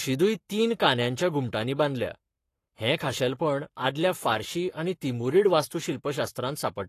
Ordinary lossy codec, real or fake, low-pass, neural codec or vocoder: AAC, 48 kbps; fake; 14.4 kHz; codec, 44.1 kHz, 7.8 kbps, Pupu-Codec